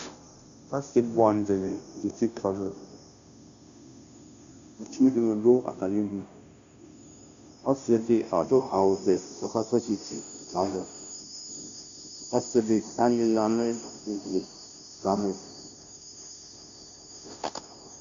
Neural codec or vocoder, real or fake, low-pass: codec, 16 kHz, 0.5 kbps, FunCodec, trained on Chinese and English, 25 frames a second; fake; 7.2 kHz